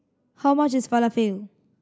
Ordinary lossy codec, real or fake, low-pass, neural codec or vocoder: none; real; none; none